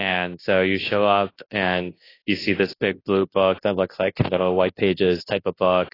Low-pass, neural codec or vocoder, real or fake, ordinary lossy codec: 5.4 kHz; codec, 24 kHz, 0.9 kbps, WavTokenizer, large speech release; fake; AAC, 24 kbps